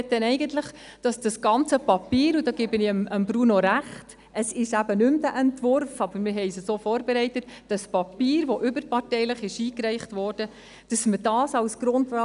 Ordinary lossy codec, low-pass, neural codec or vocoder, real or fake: none; 10.8 kHz; none; real